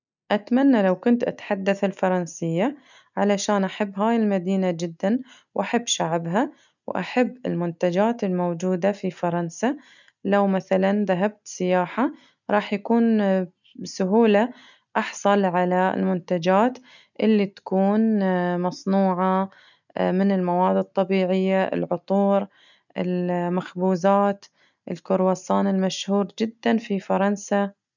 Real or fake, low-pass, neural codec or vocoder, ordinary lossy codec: real; 7.2 kHz; none; none